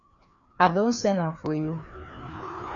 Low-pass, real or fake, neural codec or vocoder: 7.2 kHz; fake; codec, 16 kHz, 2 kbps, FreqCodec, larger model